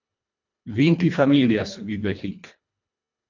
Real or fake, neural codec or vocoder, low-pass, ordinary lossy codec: fake; codec, 24 kHz, 1.5 kbps, HILCodec; 7.2 kHz; MP3, 64 kbps